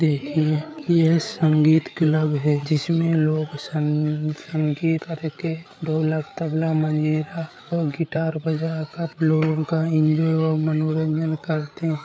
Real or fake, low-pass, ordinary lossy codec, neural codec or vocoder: fake; none; none; codec, 16 kHz, 8 kbps, FreqCodec, larger model